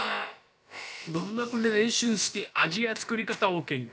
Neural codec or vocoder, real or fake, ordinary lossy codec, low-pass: codec, 16 kHz, about 1 kbps, DyCAST, with the encoder's durations; fake; none; none